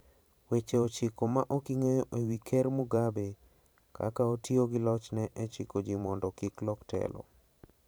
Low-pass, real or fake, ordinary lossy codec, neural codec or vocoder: none; fake; none; vocoder, 44.1 kHz, 128 mel bands every 512 samples, BigVGAN v2